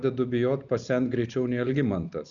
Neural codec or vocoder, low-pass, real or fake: none; 7.2 kHz; real